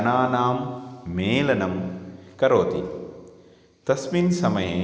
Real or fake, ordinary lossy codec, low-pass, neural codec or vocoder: real; none; none; none